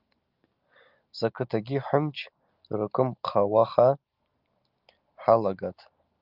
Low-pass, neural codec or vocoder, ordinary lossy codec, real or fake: 5.4 kHz; none; Opus, 24 kbps; real